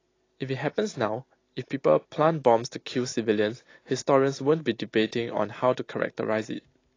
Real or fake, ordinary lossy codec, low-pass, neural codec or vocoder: real; AAC, 32 kbps; 7.2 kHz; none